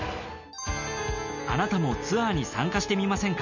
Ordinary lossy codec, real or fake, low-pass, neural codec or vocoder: none; real; 7.2 kHz; none